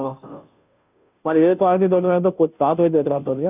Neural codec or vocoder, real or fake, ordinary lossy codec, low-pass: codec, 16 kHz, 0.5 kbps, FunCodec, trained on Chinese and English, 25 frames a second; fake; none; 3.6 kHz